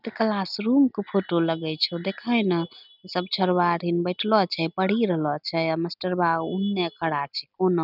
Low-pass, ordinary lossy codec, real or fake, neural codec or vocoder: 5.4 kHz; none; real; none